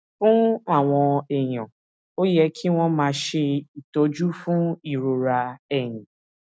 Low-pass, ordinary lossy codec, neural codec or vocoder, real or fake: none; none; none; real